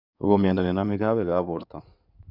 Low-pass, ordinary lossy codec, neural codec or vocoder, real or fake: 5.4 kHz; none; codec, 16 kHz in and 24 kHz out, 2.2 kbps, FireRedTTS-2 codec; fake